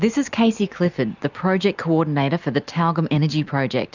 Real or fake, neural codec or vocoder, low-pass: real; none; 7.2 kHz